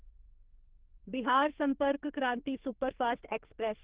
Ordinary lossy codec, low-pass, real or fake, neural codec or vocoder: Opus, 16 kbps; 3.6 kHz; fake; codec, 44.1 kHz, 2.6 kbps, SNAC